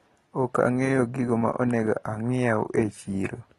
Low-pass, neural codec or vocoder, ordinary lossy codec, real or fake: 14.4 kHz; none; AAC, 32 kbps; real